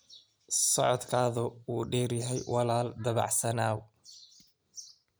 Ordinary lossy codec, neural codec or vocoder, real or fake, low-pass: none; none; real; none